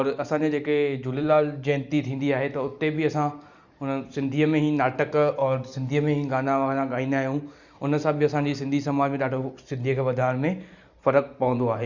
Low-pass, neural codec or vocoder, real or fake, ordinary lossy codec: 7.2 kHz; none; real; Opus, 64 kbps